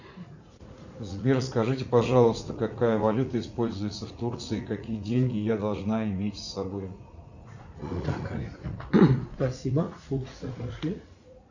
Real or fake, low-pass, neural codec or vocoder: fake; 7.2 kHz; vocoder, 44.1 kHz, 80 mel bands, Vocos